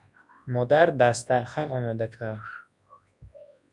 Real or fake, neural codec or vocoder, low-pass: fake; codec, 24 kHz, 0.9 kbps, WavTokenizer, large speech release; 10.8 kHz